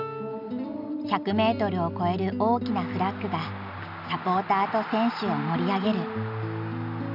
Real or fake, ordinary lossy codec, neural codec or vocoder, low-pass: real; none; none; 5.4 kHz